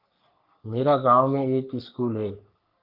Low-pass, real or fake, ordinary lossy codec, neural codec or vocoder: 5.4 kHz; fake; Opus, 24 kbps; codec, 44.1 kHz, 3.4 kbps, Pupu-Codec